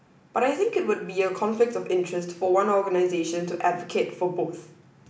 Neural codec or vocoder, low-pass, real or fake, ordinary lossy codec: none; none; real; none